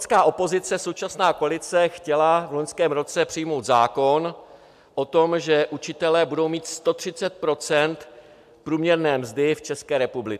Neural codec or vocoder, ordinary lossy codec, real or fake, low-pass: none; AAC, 96 kbps; real; 14.4 kHz